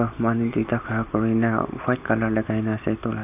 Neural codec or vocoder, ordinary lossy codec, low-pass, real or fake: none; none; 3.6 kHz; real